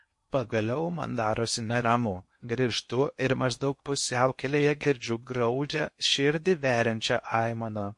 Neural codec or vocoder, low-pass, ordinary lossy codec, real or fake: codec, 16 kHz in and 24 kHz out, 0.8 kbps, FocalCodec, streaming, 65536 codes; 10.8 kHz; MP3, 48 kbps; fake